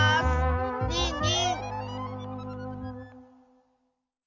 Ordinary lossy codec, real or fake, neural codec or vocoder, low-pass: none; real; none; 7.2 kHz